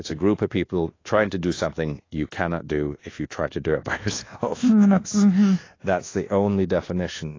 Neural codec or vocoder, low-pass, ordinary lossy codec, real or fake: codec, 24 kHz, 1.2 kbps, DualCodec; 7.2 kHz; AAC, 32 kbps; fake